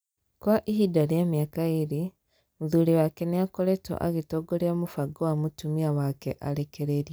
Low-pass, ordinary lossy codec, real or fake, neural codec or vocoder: none; none; real; none